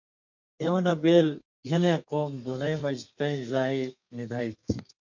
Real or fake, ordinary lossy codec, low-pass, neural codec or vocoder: fake; MP3, 48 kbps; 7.2 kHz; codec, 32 kHz, 1.9 kbps, SNAC